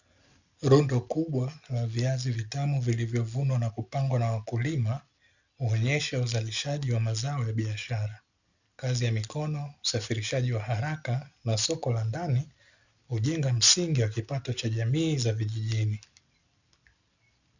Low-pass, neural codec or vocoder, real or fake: 7.2 kHz; none; real